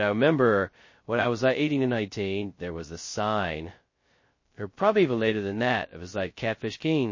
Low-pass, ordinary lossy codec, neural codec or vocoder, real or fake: 7.2 kHz; MP3, 32 kbps; codec, 16 kHz, 0.2 kbps, FocalCodec; fake